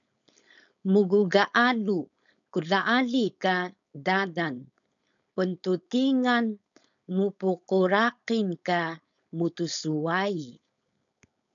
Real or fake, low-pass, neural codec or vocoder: fake; 7.2 kHz; codec, 16 kHz, 4.8 kbps, FACodec